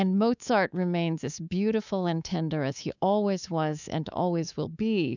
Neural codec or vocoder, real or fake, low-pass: codec, 24 kHz, 3.1 kbps, DualCodec; fake; 7.2 kHz